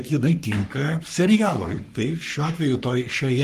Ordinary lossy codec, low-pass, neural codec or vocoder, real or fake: Opus, 24 kbps; 14.4 kHz; codec, 44.1 kHz, 3.4 kbps, Pupu-Codec; fake